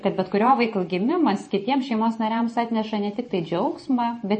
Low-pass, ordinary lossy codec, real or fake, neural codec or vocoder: 10.8 kHz; MP3, 32 kbps; fake; vocoder, 44.1 kHz, 128 mel bands every 512 samples, BigVGAN v2